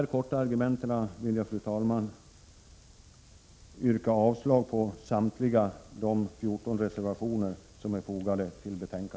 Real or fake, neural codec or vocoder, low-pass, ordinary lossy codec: real; none; none; none